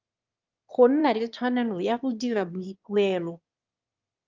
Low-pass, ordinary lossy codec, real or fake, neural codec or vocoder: 7.2 kHz; Opus, 24 kbps; fake; autoencoder, 22.05 kHz, a latent of 192 numbers a frame, VITS, trained on one speaker